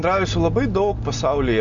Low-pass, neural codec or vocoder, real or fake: 7.2 kHz; none; real